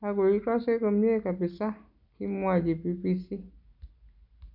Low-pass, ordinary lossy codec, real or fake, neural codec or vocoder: 5.4 kHz; none; real; none